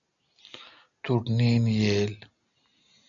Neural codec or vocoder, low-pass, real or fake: none; 7.2 kHz; real